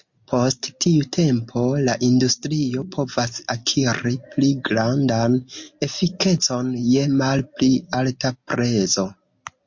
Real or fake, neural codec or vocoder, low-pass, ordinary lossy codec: real; none; 7.2 kHz; MP3, 48 kbps